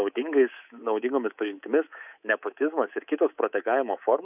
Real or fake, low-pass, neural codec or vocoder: fake; 3.6 kHz; codec, 24 kHz, 3.1 kbps, DualCodec